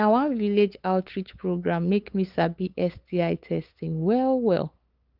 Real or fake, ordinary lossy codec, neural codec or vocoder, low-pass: fake; Opus, 24 kbps; codec, 16 kHz, 8 kbps, FunCodec, trained on LibriTTS, 25 frames a second; 5.4 kHz